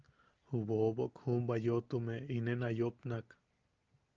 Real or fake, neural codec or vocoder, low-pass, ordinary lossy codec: real; none; 7.2 kHz; Opus, 24 kbps